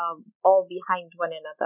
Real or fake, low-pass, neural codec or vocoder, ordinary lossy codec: real; 3.6 kHz; none; none